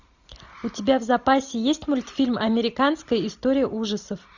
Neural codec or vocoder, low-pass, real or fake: none; 7.2 kHz; real